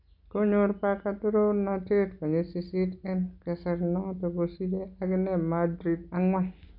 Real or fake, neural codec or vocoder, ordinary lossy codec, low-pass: real; none; none; 5.4 kHz